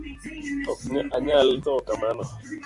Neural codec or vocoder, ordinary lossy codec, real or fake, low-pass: vocoder, 44.1 kHz, 128 mel bands every 256 samples, BigVGAN v2; Opus, 64 kbps; fake; 10.8 kHz